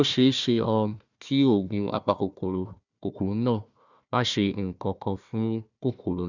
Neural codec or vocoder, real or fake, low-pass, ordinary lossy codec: codec, 16 kHz, 1 kbps, FunCodec, trained on Chinese and English, 50 frames a second; fake; 7.2 kHz; none